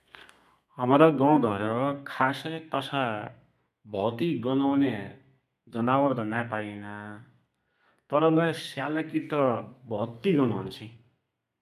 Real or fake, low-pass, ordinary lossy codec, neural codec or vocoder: fake; 14.4 kHz; none; codec, 32 kHz, 1.9 kbps, SNAC